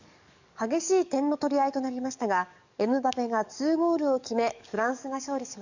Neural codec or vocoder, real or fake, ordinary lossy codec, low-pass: codec, 44.1 kHz, 7.8 kbps, DAC; fake; none; 7.2 kHz